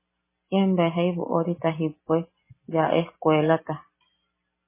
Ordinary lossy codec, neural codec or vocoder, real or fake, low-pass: MP3, 16 kbps; none; real; 3.6 kHz